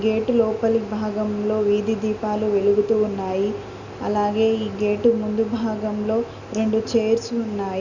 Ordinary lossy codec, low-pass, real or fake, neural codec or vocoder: Opus, 64 kbps; 7.2 kHz; real; none